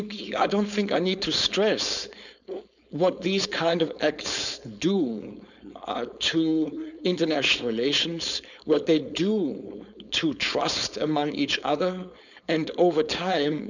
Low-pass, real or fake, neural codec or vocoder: 7.2 kHz; fake; codec, 16 kHz, 4.8 kbps, FACodec